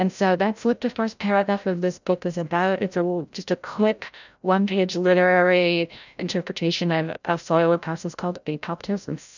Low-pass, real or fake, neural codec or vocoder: 7.2 kHz; fake; codec, 16 kHz, 0.5 kbps, FreqCodec, larger model